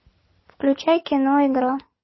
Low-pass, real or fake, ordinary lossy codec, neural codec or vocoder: 7.2 kHz; real; MP3, 24 kbps; none